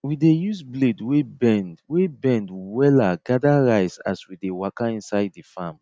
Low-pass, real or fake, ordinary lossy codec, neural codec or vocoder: none; real; none; none